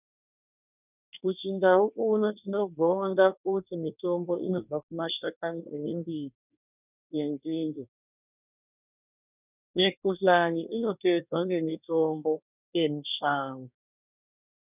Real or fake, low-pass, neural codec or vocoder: fake; 3.6 kHz; codec, 24 kHz, 1 kbps, SNAC